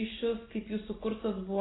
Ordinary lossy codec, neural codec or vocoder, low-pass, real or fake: AAC, 16 kbps; none; 7.2 kHz; real